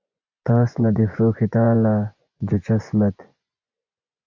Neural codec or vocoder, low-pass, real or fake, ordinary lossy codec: vocoder, 24 kHz, 100 mel bands, Vocos; 7.2 kHz; fake; Opus, 64 kbps